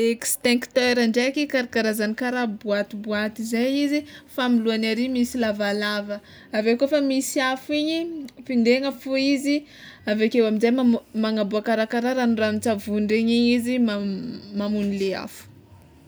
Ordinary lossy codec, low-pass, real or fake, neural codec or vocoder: none; none; real; none